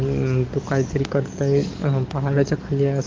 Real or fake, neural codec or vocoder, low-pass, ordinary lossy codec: fake; codec, 44.1 kHz, 7.8 kbps, DAC; 7.2 kHz; Opus, 32 kbps